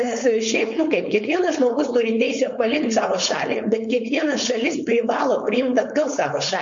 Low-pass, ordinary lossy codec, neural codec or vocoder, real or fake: 7.2 kHz; MP3, 48 kbps; codec, 16 kHz, 4.8 kbps, FACodec; fake